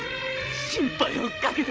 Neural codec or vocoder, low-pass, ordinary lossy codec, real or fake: codec, 16 kHz, 16 kbps, FreqCodec, larger model; none; none; fake